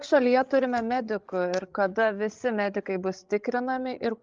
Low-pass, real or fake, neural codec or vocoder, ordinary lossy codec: 7.2 kHz; fake; codec, 16 kHz, 16 kbps, FreqCodec, larger model; Opus, 24 kbps